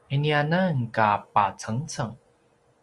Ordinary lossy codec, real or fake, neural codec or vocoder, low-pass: Opus, 32 kbps; real; none; 10.8 kHz